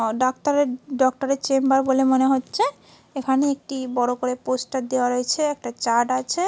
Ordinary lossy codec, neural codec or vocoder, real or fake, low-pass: none; none; real; none